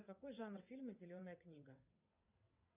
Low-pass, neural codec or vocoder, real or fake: 3.6 kHz; vocoder, 22.05 kHz, 80 mel bands, WaveNeXt; fake